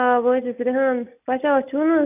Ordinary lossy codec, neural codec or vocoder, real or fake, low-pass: none; none; real; 3.6 kHz